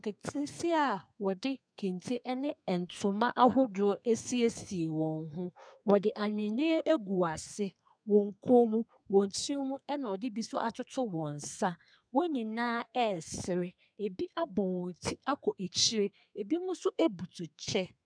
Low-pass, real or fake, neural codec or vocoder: 9.9 kHz; fake; codec, 32 kHz, 1.9 kbps, SNAC